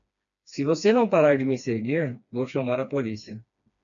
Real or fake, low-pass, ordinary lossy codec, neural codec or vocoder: fake; 7.2 kHz; MP3, 96 kbps; codec, 16 kHz, 2 kbps, FreqCodec, smaller model